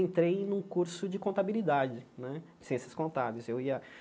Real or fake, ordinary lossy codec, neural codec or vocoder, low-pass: real; none; none; none